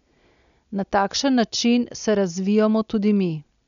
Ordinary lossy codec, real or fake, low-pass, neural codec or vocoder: none; real; 7.2 kHz; none